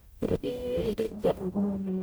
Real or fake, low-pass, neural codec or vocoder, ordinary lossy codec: fake; none; codec, 44.1 kHz, 0.9 kbps, DAC; none